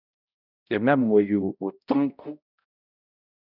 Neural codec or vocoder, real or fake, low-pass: codec, 16 kHz, 0.5 kbps, X-Codec, HuBERT features, trained on balanced general audio; fake; 5.4 kHz